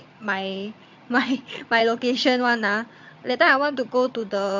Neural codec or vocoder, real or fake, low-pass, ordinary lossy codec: vocoder, 22.05 kHz, 80 mel bands, HiFi-GAN; fake; 7.2 kHz; MP3, 48 kbps